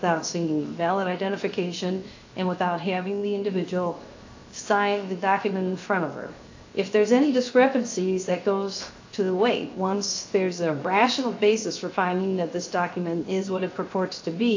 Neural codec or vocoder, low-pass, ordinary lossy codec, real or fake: codec, 16 kHz, 0.7 kbps, FocalCodec; 7.2 kHz; AAC, 48 kbps; fake